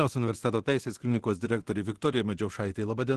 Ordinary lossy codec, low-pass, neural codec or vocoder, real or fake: Opus, 16 kbps; 10.8 kHz; codec, 24 kHz, 0.9 kbps, DualCodec; fake